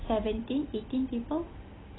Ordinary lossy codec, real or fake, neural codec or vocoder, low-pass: AAC, 16 kbps; real; none; 7.2 kHz